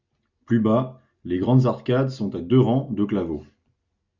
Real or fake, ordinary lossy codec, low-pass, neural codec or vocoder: real; Opus, 64 kbps; 7.2 kHz; none